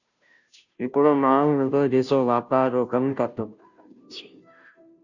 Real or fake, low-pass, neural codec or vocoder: fake; 7.2 kHz; codec, 16 kHz, 0.5 kbps, FunCodec, trained on Chinese and English, 25 frames a second